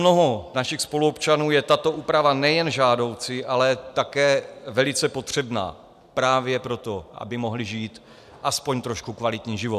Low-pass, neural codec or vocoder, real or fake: 14.4 kHz; none; real